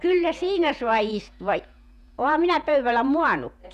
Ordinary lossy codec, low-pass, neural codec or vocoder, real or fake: none; 14.4 kHz; vocoder, 48 kHz, 128 mel bands, Vocos; fake